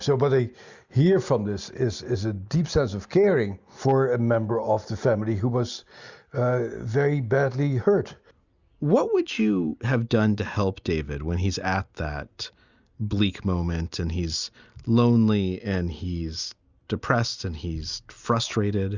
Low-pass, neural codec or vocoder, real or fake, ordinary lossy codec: 7.2 kHz; none; real; Opus, 64 kbps